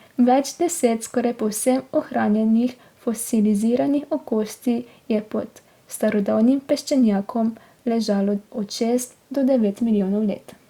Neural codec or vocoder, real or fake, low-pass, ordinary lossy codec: none; real; 19.8 kHz; Opus, 64 kbps